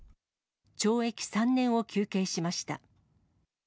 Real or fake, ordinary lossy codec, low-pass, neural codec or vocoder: real; none; none; none